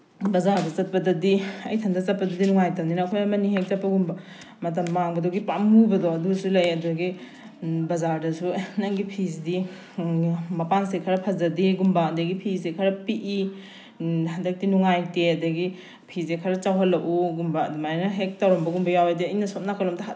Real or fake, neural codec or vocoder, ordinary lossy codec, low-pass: real; none; none; none